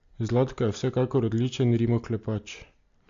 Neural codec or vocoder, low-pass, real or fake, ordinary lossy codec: none; 7.2 kHz; real; AAC, 64 kbps